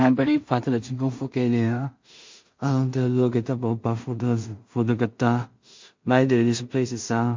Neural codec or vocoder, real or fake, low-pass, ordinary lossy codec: codec, 16 kHz in and 24 kHz out, 0.4 kbps, LongCat-Audio-Codec, two codebook decoder; fake; 7.2 kHz; MP3, 48 kbps